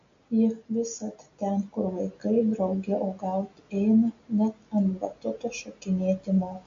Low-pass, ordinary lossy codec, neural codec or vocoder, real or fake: 7.2 kHz; MP3, 48 kbps; none; real